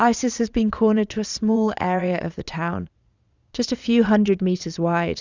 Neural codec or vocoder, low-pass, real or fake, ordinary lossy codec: vocoder, 22.05 kHz, 80 mel bands, Vocos; 7.2 kHz; fake; Opus, 64 kbps